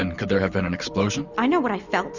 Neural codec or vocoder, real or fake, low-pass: none; real; 7.2 kHz